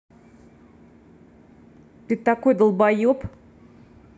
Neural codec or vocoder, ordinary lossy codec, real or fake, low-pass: none; none; real; none